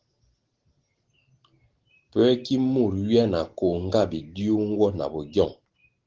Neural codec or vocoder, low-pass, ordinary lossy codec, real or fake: none; 7.2 kHz; Opus, 16 kbps; real